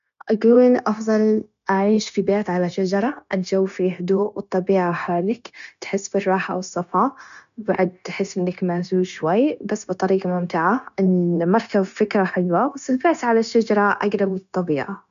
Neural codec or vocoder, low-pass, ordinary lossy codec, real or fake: codec, 16 kHz, 0.9 kbps, LongCat-Audio-Codec; 7.2 kHz; none; fake